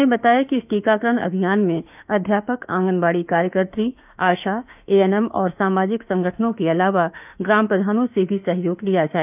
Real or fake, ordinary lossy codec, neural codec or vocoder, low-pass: fake; none; autoencoder, 48 kHz, 32 numbers a frame, DAC-VAE, trained on Japanese speech; 3.6 kHz